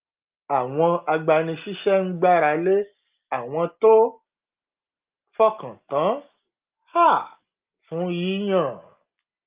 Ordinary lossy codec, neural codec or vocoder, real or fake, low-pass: Opus, 24 kbps; none; real; 3.6 kHz